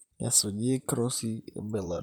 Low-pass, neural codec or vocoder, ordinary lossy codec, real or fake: none; vocoder, 44.1 kHz, 128 mel bands, Pupu-Vocoder; none; fake